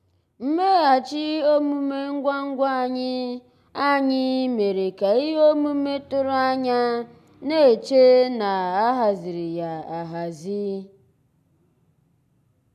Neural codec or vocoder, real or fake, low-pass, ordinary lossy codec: none; real; 14.4 kHz; none